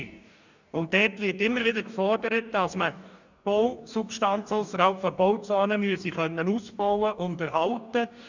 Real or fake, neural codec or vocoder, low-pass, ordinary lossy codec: fake; codec, 44.1 kHz, 2.6 kbps, DAC; 7.2 kHz; none